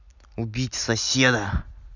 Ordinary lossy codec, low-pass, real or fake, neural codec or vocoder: none; 7.2 kHz; real; none